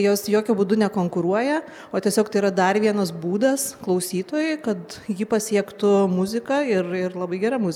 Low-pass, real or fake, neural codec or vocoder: 19.8 kHz; real; none